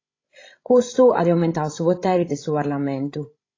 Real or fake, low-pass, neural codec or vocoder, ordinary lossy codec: fake; 7.2 kHz; codec, 16 kHz, 16 kbps, FreqCodec, larger model; AAC, 32 kbps